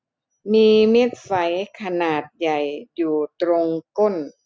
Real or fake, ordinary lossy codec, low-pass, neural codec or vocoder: real; none; none; none